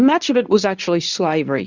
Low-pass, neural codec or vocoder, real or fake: 7.2 kHz; codec, 24 kHz, 0.9 kbps, WavTokenizer, medium speech release version 2; fake